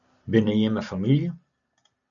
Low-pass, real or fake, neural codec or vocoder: 7.2 kHz; real; none